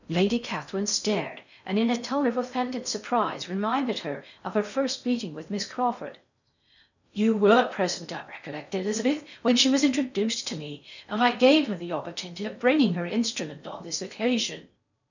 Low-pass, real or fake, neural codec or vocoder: 7.2 kHz; fake; codec, 16 kHz in and 24 kHz out, 0.6 kbps, FocalCodec, streaming, 4096 codes